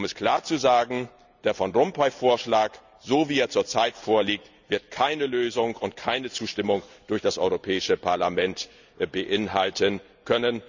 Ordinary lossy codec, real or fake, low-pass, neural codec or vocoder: none; real; 7.2 kHz; none